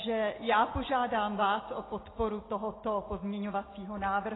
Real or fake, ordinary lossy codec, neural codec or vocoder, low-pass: real; AAC, 16 kbps; none; 7.2 kHz